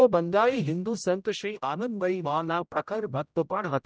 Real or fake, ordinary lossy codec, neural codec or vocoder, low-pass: fake; none; codec, 16 kHz, 0.5 kbps, X-Codec, HuBERT features, trained on general audio; none